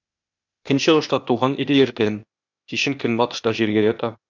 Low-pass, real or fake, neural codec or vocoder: 7.2 kHz; fake; codec, 16 kHz, 0.8 kbps, ZipCodec